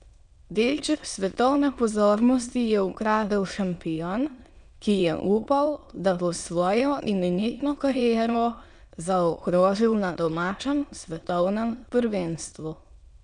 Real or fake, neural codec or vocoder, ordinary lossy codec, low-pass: fake; autoencoder, 22.05 kHz, a latent of 192 numbers a frame, VITS, trained on many speakers; none; 9.9 kHz